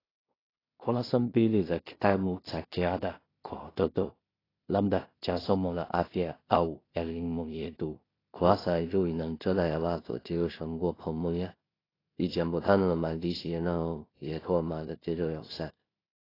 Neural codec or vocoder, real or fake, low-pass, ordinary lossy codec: codec, 16 kHz in and 24 kHz out, 0.4 kbps, LongCat-Audio-Codec, two codebook decoder; fake; 5.4 kHz; AAC, 24 kbps